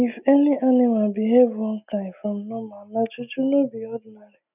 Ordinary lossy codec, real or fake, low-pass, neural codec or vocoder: none; real; 3.6 kHz; none